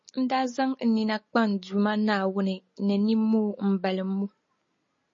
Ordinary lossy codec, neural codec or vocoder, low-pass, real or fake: MP3, 32 kbps; none; 7.2 kHz; real